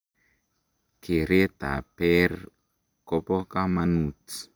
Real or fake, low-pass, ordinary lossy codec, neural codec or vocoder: real; none; none; none